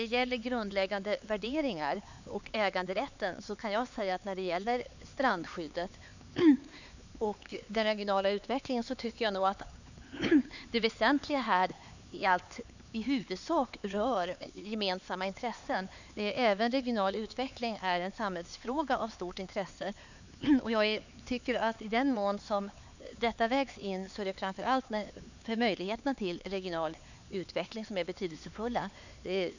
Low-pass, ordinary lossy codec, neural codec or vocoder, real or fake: 7.2 kHz; none; codec, 16 kHz, 4 kbps, X-Codec, HuBERT features, trained on LibriSpeech; fake